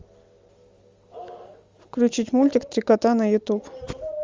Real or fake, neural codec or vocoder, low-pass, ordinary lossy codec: real; none; 7.2 kHz; Opus, 32 kbps